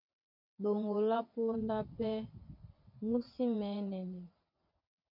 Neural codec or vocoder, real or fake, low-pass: vocoder, 22.05 kHz, 80 mel bands, WaveNeXt; fake; 5.4 kHz